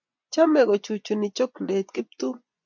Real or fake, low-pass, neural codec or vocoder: fake; 7.2 kHz; vocoder, 24 kHz, 100 mel bands, Vocos